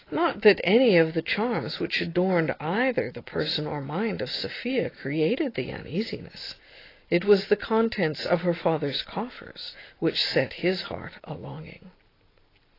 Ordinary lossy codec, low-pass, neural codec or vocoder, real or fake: AAC, 24 kbps; 5.4 kHz; none; real